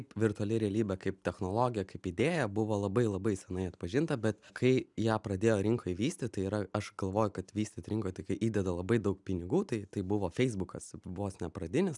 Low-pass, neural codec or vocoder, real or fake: 10.8 kHz; none; real